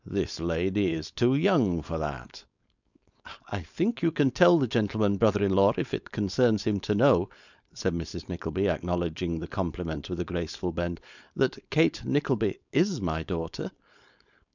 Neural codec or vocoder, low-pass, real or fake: codec, 16 kHz, 4.8 kbps, FACodec; 7.2 kHz; fake